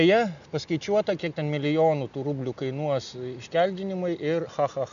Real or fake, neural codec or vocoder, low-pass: real; none; 7.2 kHz